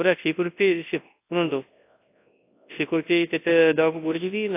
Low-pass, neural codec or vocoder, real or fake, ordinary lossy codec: 3.6 kHz; codec, 24 kHz, 0.9 kbps, WavTokenizer, large speech release; fake; AAC, 24 kbps